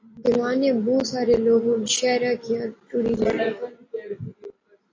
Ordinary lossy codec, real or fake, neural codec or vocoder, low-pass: AAC, 32 kbps; real; none; 7.2 kHz